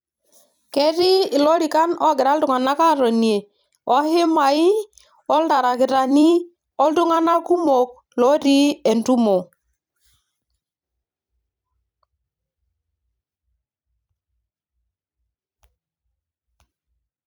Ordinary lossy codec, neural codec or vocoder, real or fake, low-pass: none; vocoder, 44.1 kHz, 128 mel bands every 256 samples, BigVGAN v2; fake; none